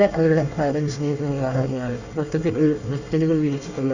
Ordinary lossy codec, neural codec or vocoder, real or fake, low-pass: none; codec, 24 kHz, 1 kbps, SNAC; fake; 7.2 kHz